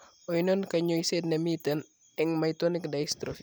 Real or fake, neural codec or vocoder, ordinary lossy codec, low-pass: real; none; none; none